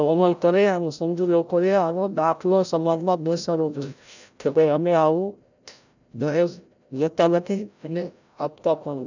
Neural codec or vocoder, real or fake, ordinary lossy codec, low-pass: codec, 16 kHz, 0.5 kbps, FreqCodec, larger model; fake; none; 7.2 kHz